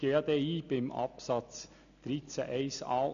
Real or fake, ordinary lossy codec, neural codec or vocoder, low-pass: real; none; none; 7.2 kHz